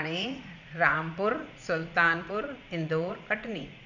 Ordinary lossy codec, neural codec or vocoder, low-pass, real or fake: none; vocoder, 44.1 kHz, 80 mel bands, Vocos; 7.2 kHz; fake